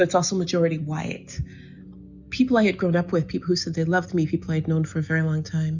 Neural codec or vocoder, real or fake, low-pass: none; real; 7.2 kHz